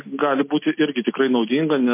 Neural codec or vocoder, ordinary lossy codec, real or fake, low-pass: none; MP3, 24 kbps; real; 3.6 kHz